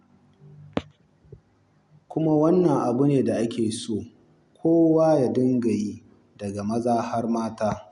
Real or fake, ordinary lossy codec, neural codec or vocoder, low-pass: real; MP3, 64 kbps; none; 10.8 kHz